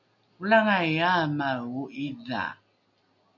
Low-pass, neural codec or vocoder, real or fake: 7.2 kHz; none; real